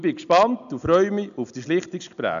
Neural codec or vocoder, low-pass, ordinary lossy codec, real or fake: none; 7.2 kHz; none; real